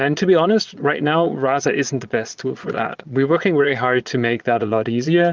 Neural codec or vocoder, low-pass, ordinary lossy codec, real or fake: vocoder, 22.05 kHz, 80 mel bands, Vocos; 7.2 kHz; Opus, 24 kbps; fake